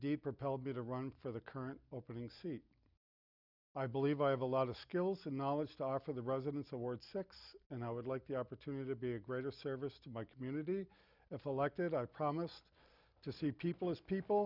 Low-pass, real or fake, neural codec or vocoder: 5.4 kHz; real; none